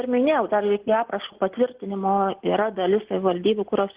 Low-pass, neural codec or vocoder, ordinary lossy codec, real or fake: 3.6 kHz; none; Opus, 16 kbps; real